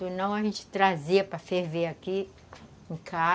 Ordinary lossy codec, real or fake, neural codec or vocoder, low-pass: none; real; none; none